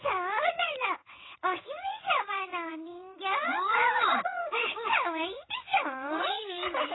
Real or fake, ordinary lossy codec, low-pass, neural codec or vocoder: fake; AAC, 16 kbps; 7.2 kHz; vocoder, 22.05 kHz, 80 mel bands, WaveNeXt